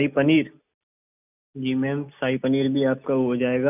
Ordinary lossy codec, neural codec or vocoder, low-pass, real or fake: none; none; 3.6 kHz; real